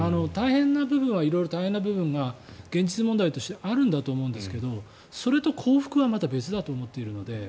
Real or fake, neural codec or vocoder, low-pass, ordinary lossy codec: real; none; none; none